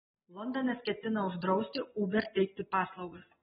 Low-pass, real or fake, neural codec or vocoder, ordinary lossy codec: 7.2 kHz; real; none; AAC, 16 kbps